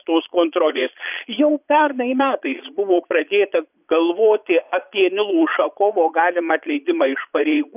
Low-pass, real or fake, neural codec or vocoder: 3.6 kHz; fake; vocoder, 22.05 kHz, 80 mel bands, Vocos